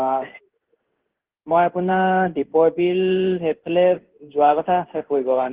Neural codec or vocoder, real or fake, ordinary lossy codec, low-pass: codec, 16 kHz in and 24 kHz out, 1 kbps, XY-Tokenizer; fake; Opus, 16 kbps; 3.6 kHz